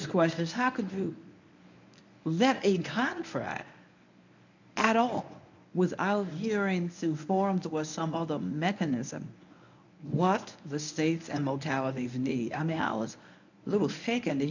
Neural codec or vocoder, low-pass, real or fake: codec, 24 kHz, 0.9 kbps, WavTokenizer, medium speech release version 1; 7.2 kHz; fake